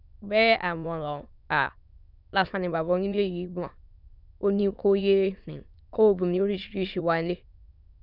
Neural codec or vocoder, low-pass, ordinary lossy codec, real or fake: autoencoder, 22.05 kHz, a latent of 192 numbers a frame, VITS, trained on many speakers; 5.4 kHz; none; fake